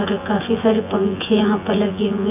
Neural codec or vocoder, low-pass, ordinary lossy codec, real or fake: vocoder, 24 kHz, 100 mel bands, Vocos; 3.6 kHz; none; fake